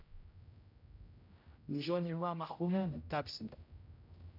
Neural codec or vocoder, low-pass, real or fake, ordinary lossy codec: codec, 16 kHz, 0.5 kbps, X-Codec, HuBERT features, trained on general audio; 5.4 kHz; fake; none